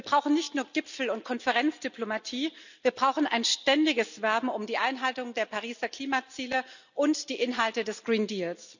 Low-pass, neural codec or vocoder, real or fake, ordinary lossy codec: 7.2 kHz; none; real; none